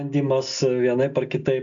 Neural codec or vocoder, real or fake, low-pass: none; real; 7.2 kHz